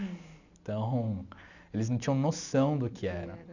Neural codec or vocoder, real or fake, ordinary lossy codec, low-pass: none; real; none; 7.2 kHz